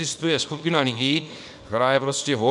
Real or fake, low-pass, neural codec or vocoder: fake; 10.8 kHz; codec, 24 kHz, 0.9 kbps, WavTokenizer, small release